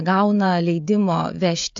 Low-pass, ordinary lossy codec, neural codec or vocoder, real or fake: 7.2 kHz; AAC, 64 kbps; codec, 16 kHz, 16 kbps, FreqCodec, smaller model; fake